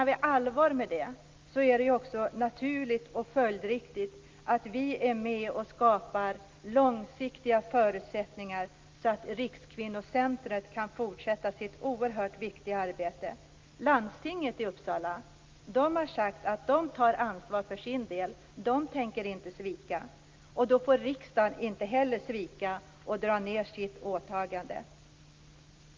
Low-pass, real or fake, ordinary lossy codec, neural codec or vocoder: 7.2 kHz; real; Opus, 32 kbps; none